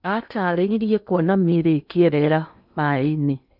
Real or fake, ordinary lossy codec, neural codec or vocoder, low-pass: fake; none; codec, 16 kHz in and 24 kHz out, 0.8 kbps, FocalCodec, streaming, 65536 codes; 5.4 kHz